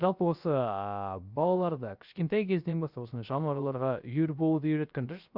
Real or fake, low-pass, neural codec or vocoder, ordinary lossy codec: fake; 5.4 kHz; codec, 16 kHz, 0.3 kbps, FocalCodec; Opus, 64 kbps